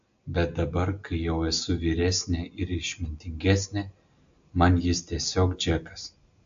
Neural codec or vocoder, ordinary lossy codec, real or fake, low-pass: none; AAC, 64 kbps; real; 7.2 kHz